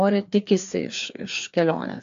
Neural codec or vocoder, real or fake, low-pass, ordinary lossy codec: codec, 16 kHz, 2 kbps, FreqCodec, larger model; fake; 7.2 kHz; AAC, 64 kbps